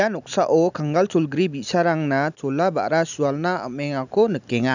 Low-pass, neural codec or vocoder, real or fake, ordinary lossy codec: 7.2 kHz; none; real; none